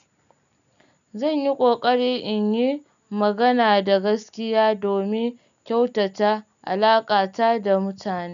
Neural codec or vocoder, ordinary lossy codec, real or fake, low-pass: none; none; real; 7.2 kHz